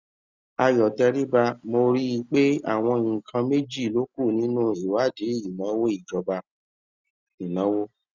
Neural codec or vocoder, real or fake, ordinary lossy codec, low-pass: none; real; Opus, 64 kbps; 7.2 kHz